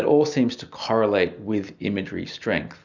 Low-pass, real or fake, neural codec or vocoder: 7.2 kHz; real; none